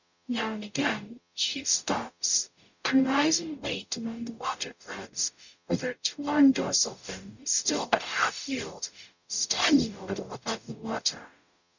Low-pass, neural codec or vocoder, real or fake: 7.2 kHz; codec, 44.1 kHz, 0.9 kbps, DAC; fake